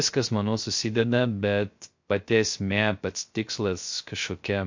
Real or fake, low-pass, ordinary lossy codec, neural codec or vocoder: fake; 7.2 kHz; MP3, 48 kbps; codec, 16 kHz, 0.3 kbps, FocalCodec